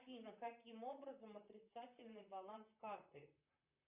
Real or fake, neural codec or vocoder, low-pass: fake; vocoder, 44.1 kHz, 128 mel bands, Pupu-Vocoder; 3.6 kHz